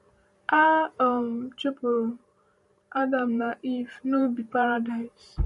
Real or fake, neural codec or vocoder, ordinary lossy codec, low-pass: fake; vocoder, 44.1 kHz, 128 mel bands every 512 samples, BigVGAN v2; MP3, 48 kbps; 14.4 kHz